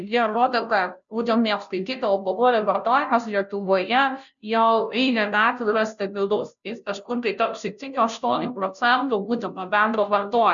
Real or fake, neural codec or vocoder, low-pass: fake; codec, 16 kHz, 0.5 kbps, FunCodec, trained on Chinese and English, 25 frames a second; 7.2 kHz